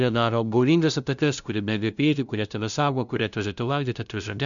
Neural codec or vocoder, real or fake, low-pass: codec, 16 kHz, 0.5 kbps, FunCodec, trained on LibriTTS, 25 frames a second; fake; 7.2 kHz